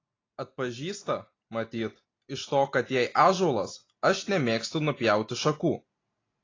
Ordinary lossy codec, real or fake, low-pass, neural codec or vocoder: AAC, 32 kbps; real; 7.2 kHz; none